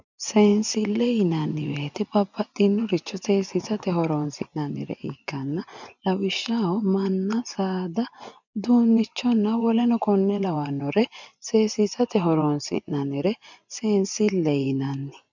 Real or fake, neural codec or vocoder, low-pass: fake; vocoder, 24 kHz, 100 mel bands, Vocos; 7.2 kHz